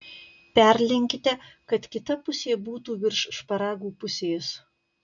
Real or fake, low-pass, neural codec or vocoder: real; 7.2 kHz; none